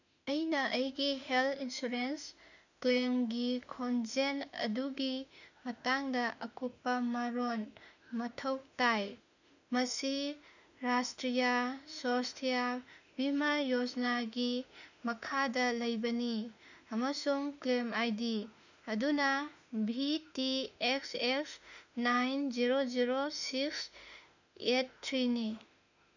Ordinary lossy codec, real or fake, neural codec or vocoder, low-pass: none; fake; autoencoder, 48 kHz, 32 numbers a frame, DAC-VAE, trained on Japanese speech; 7.2 kHz